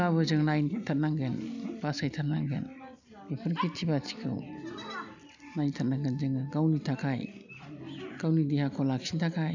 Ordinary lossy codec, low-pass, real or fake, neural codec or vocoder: none; 7.2 kHz; real; none